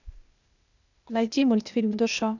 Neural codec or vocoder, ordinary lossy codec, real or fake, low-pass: codec, 16 kHz, 0.8 kbps, ZipCodec; none; fake; 7.2 kHz